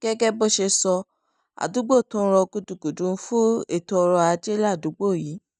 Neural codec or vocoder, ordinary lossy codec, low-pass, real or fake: none; none; 10.8 kHz; real